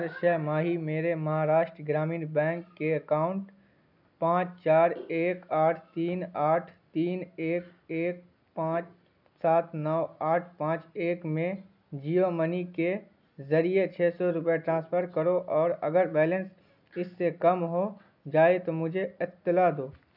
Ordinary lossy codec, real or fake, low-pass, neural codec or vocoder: none; real; 5.4 kHz; none